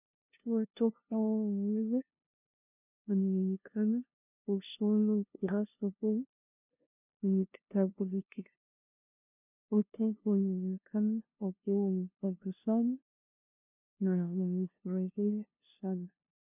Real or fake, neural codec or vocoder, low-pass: fake; codec, 16 kHz, 0.5 kbps, FunCodec, trained on LibriTTS, 25 frames a second; 3.6 kHz